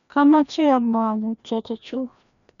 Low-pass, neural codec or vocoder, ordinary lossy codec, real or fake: 7.2 kHz; codec, 16 kHz, 1 kbps, FreqCodec, larger model; none; fake